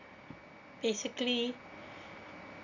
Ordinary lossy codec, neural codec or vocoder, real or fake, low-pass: none; none; real; 7.2 kHz